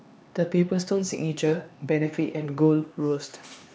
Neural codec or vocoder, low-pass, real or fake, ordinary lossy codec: codec, 16 kHz, 2 kbps, X-Codec, HuBERT features, trained on LibriSpeech; none; fake; none